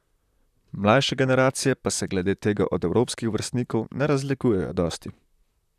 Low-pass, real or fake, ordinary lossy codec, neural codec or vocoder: 14.4 kHz; fake; none; vocoder, 44.1 kHz, 128 mel bands, Pupu-Vocoder